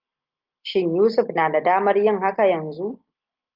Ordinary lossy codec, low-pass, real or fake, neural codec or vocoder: Opus, 32 kbps; 5.4 kHz; real; none